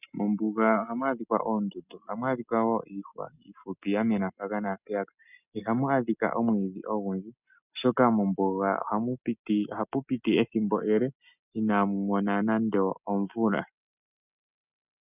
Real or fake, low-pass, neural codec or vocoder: real; 3.6 kHz; none